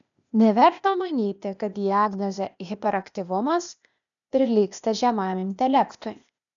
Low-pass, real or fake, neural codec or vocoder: 7.2 kHz; fake; codec, 16 kHz, 0.8 kbps, ZipCodec